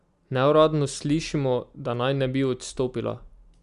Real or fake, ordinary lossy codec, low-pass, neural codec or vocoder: real; Opus, 64 kbps; 10.8 kHz; none